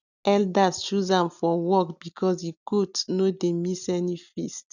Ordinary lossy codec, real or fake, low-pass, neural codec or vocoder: none; real; 7.2 kHz; none